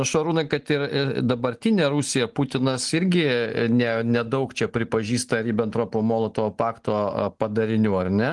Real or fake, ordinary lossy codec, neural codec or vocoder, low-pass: real; Opus, 24 kbps; none; 10.8 kHz